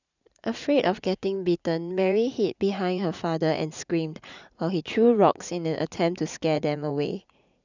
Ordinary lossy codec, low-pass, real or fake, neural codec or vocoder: none; 7.2 kHz; fake; vocoder, 44.1 kHz, 80 mel bands, Vocos